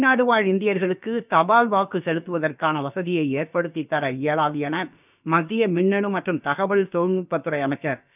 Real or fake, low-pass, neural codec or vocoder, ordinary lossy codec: fake; 3.6 kHz; codec, 16 kHz, about 1 kbps, DyCAST, with the encoder's durations; none